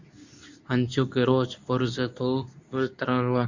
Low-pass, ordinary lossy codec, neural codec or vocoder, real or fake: 7.2 kHz; Opus, 64 kbps; codec, 24 kHz, 0.9 kbps, WavTokenizer, medium speech release version 2; fake